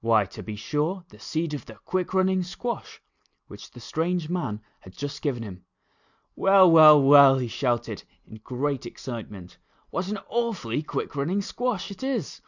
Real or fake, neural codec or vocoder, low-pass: real; none; 7.2 kHz